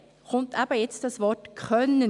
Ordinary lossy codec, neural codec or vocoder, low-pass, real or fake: none; none; 10.8 kHz; real